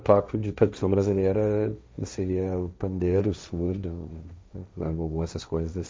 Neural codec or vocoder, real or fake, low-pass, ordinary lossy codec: codec, 16 kHz, 1.1 kbps, Voila-Tokenizer; fake; none; none